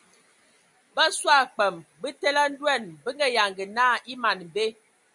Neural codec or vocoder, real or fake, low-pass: none; real; 10.8 kHz